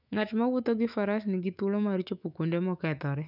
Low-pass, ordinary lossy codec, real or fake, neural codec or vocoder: 5.4 kHz; none; fake; autoencoder, 48 kHz, 128 numbers a frame, DAC-VAE, trained on Japanese speech